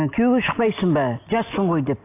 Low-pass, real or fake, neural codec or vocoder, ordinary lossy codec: 3.6 kHz; real; none; AAC, 24 kbps